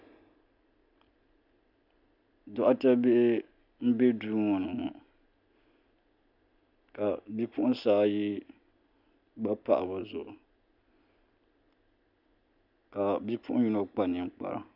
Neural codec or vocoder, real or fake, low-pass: none; real; 5.4 kHz